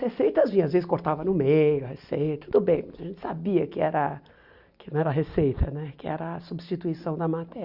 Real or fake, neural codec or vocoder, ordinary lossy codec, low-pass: real; none; Opus, 64 kbps; 5.4 kHz